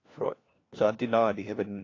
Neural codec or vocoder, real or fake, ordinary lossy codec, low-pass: codec, 16 kHz, 1 kbps, FunCodec, trained on LibriTTS, 50 frames a second; fake; AAC, 32 kbps; 7.2 kHz